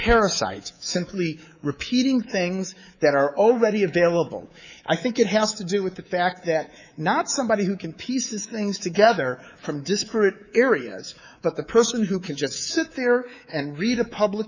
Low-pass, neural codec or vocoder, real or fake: 7.2 kHz; codec, 24 kHz, 3.1 kbps, DualCodec; fake